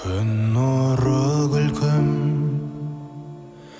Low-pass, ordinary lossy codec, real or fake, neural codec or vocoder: none; none; real; none